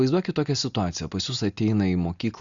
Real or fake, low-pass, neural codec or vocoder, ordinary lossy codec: real; 7.2 kHz; none; Opus, 64 kbps